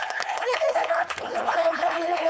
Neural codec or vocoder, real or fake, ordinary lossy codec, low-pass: codec, 16 kHz, 4.8 kbps, FACodec; fake; none; none